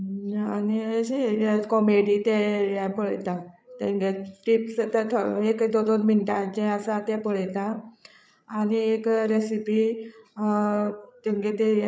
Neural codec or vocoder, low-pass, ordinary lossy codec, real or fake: codec, 16 kHz, 8 kbps, FreqCodec, larger model; none; none; fake